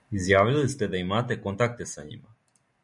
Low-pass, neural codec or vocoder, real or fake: 10.8 kHz; none; real